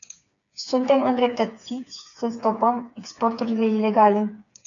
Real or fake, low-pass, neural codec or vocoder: fake; 7.2 kHz; codec, 16 kHz, 4 kbps, FreqCodec, smaller model